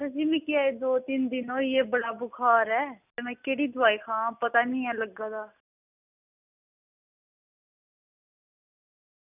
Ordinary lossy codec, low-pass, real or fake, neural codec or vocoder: none; 3.6 kHz; real; none